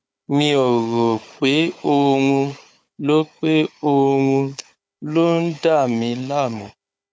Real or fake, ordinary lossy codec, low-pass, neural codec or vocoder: fake; none; none; codec, 16 kHz, 4 kbps, FunCodec, trained on Chinese and English, 50 frames a second